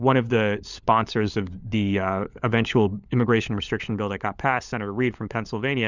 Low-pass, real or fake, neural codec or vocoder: 7.2 kHz; fake; codec, 16 kHz, 16 kbps, FunCodec, trained on LibriTTS, 50 frames a second